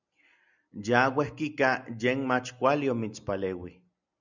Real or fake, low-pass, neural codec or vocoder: real; 7.2 kHz; none